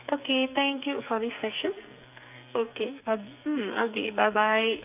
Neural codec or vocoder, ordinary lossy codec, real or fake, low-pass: codec, 44.1 kHz, 2.6 kbps, SNAC; none; fake; 3.6 kHz